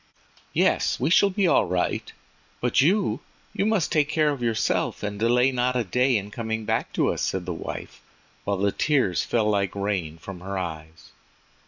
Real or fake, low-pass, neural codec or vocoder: real; 7.2 kHz; none